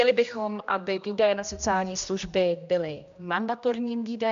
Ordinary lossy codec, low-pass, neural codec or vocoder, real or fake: MP3, 64 kbps; 7.2 kHz; codec, 16 kHz, 1 kbps, X-Codec, HuBERT features, trained on general audio; fake